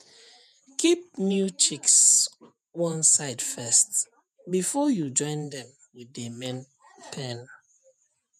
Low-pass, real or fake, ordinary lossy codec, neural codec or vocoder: 14.4 kHz; fake; none; vocoder, 48 kHz, 128 mel bands, Vocos